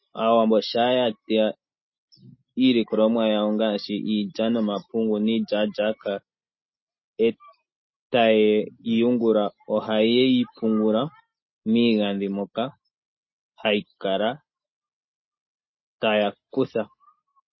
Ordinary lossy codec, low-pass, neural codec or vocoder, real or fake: MP3, 24 kbps; 7.2 kHz; none; real